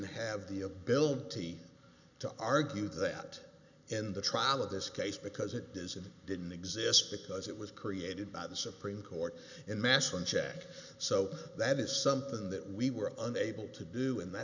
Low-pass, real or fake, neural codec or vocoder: 7.2 kHz; real; none